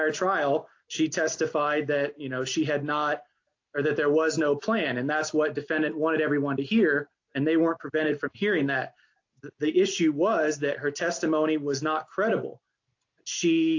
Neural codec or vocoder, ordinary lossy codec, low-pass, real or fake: none; AAC, 48 kbps; 7.2 kHz; real